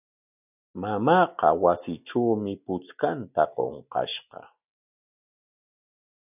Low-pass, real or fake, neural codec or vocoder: 3.6 kHz; real; none